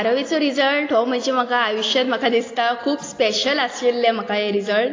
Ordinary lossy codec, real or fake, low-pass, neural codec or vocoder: AAC, 32 kbps; real; 7.2 kHz; none